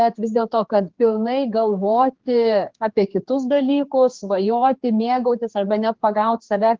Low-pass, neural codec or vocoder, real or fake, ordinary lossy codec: 7.2 kHz; codec, 16 kHz, 4 kbps, X-Codec, HuBERT features, trained on general audio; fake; Opus, 16 kbps